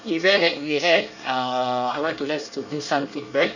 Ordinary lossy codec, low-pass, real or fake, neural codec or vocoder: none; 7.2 kHz; fake; codec, 24 kHz, 1 kbps, SNAC